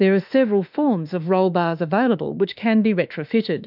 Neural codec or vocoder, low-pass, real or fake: autoencoder, 48 kHz, 32 numbers a frame, DAC-VAE, trained on Japanese speech; 5.4 kHz; fake